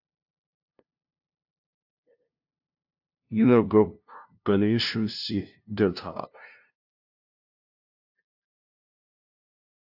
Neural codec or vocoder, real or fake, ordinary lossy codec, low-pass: codec, 16 kHz, 0.5 kbps, FunCodec, trained on LibriTTS, 25 frames a second; fake; AAC, 48 kbps; 5.4 kHz